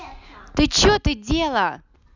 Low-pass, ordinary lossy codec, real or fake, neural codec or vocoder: 7.2 kHz; none; real; none